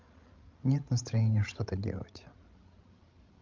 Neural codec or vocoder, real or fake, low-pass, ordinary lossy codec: codec, 16 kHz, 16 kbps, FreqCodec, larger model; fake; 7.2 kHz; Opus, 32 kbps